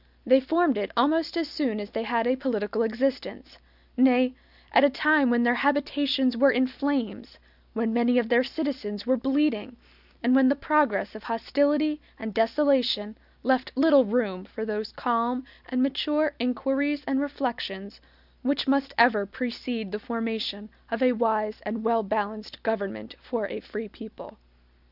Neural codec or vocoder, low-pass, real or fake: none; 5.4 kHz; real